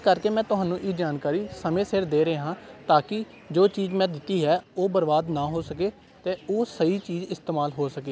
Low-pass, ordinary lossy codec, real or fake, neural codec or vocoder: none; none; real; none